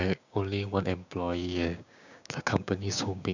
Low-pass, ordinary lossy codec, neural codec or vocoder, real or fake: 7.2 kHz; none; codec, 16 kHz, 6 kbps, DAC; fake